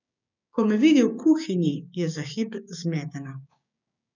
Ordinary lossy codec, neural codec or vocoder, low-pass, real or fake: none; codec, 16 kHz, 6 kbps, DAC; 7.2 kHz; fake